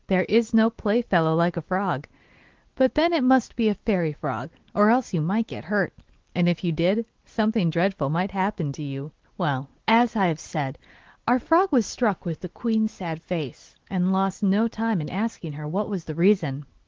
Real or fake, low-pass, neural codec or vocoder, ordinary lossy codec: real; 7.2 kHz; none; Opus, 16 kbps